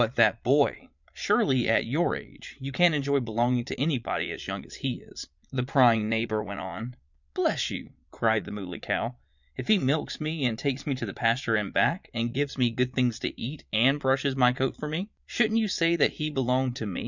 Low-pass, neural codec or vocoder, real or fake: 7.2 kHz; vocoder, 22.05 kHz, 80 mel bands, Vocos; fake